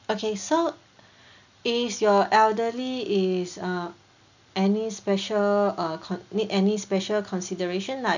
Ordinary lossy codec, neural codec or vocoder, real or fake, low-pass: none; none; real; 7.2 kHz